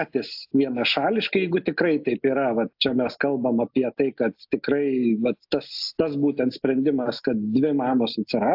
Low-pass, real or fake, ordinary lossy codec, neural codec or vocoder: 5.4 kHz; real; AAC, 48 kbps; none